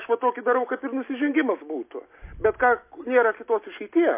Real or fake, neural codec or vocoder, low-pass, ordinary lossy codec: real; none; 3.6 kHz; MP3, 24 kbps